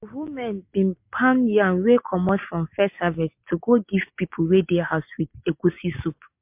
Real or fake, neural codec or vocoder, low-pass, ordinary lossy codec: real; none; 3.6 kHz; MP3, 32 kbps